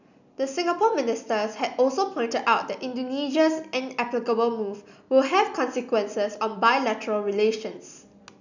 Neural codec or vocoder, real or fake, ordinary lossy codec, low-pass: none; real; none; 7.2 kHz